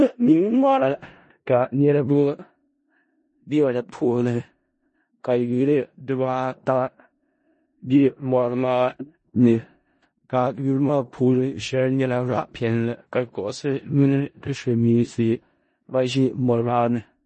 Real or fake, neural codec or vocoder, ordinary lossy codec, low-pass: fake; codec, 16 kHz in and 24 kHz out, 0.4 kbps, LongCat-Audio-Codec, four codebook decoder; MP3, 32 kbps; 10.8 kHz